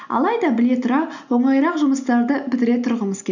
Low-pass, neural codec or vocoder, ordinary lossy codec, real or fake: 7.2 kHz; none; none; real